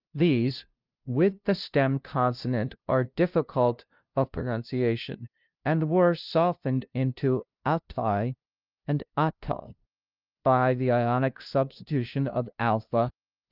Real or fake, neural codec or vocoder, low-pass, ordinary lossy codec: fake; codec, 16 kHz, 0.5 kbps, FunCodec, trained on LibriTTS, 25 frames a second; 5.4 kHz; Opus, 24 kbps